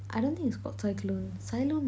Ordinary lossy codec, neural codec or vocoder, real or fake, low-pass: none; none; real; none